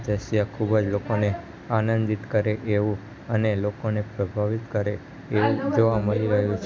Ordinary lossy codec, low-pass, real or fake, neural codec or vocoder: none; none; real; none